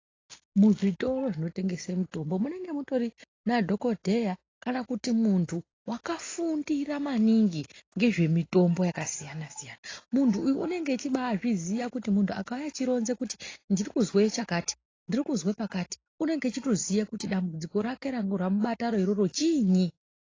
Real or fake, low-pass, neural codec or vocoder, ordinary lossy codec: real; 7.2 kHz; none; AAC, 32 kbps